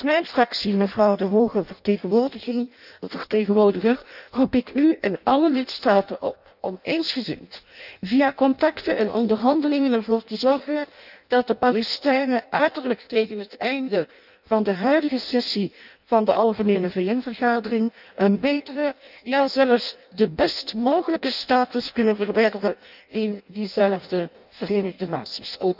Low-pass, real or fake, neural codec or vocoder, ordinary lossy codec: 5.4 kHz; fake; codec, 16 kHz in and 24 kHz out, 0.6 kbps, FireRedTTS-2 codec; none